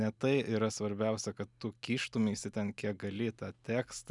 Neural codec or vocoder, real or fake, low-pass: none; real; 10.8 kHz